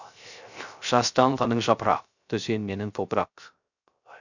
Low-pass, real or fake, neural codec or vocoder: 7.2 kHz; fake; codec, 16 kHz, 0.3 kbps, FocalCodec